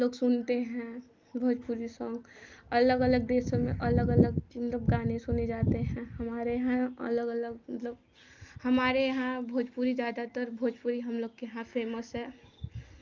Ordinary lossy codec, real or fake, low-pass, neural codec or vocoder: Opus, 24 kbps; real; 7.2 kHz; none